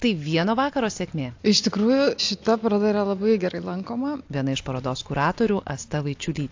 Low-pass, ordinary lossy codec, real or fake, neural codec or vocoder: 7.2 kHz; AAC, 48 kbps; real; none